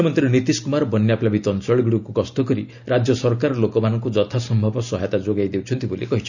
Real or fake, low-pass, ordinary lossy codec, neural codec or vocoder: real; 7.2 kHz; none; none